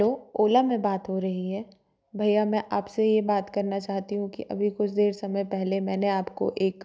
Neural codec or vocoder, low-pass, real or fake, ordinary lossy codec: none; none; real; none